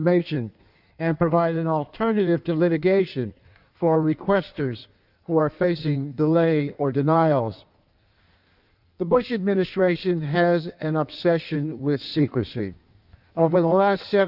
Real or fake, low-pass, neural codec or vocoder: fake; 5.4 kHz; codec, 16 kHz in and 24 kHz out, 1.1 kbps, FireRedTTS-2 codec